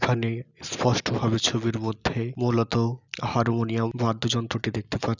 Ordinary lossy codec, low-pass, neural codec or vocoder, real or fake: none; 7.2 kHz; none; real